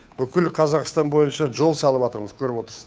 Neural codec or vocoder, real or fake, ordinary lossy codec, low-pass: codec, 16 kHz, 2 kbps, FunCodec, trained on Chinese and English, 25 frames a second; fake; none; none